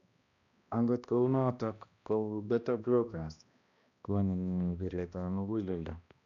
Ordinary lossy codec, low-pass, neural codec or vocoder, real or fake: none; 7.2 kHz; codec, 16 kHz, 1 kbps, X-Codec, HuBERT features, trained on balanced general audio; fake